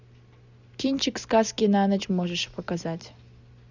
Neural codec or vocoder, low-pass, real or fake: none; 7.2 kHz; real